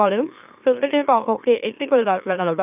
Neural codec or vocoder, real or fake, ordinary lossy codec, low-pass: autoencoder, 44.1 kHz, a latent of 192 numbers a frame, MeloTTS; fake; none; 3.6 kHz